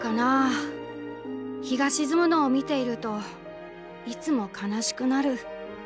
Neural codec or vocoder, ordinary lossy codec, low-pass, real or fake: none; none; none; real